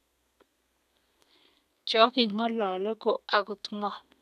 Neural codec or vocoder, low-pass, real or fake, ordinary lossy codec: codec, 32 kHz, 1.9 kbps, SNAC; 14.4 kHz; fake; none